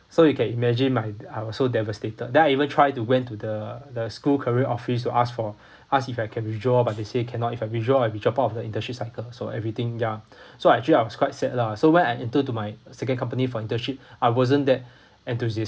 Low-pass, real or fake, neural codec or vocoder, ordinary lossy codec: none; real; none; none